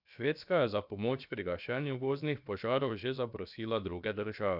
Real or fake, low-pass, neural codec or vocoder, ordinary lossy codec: fake; 5.4 kHz; codec, 16 kHz, about 1 kbps, DyCAST, with the encoder's durations; none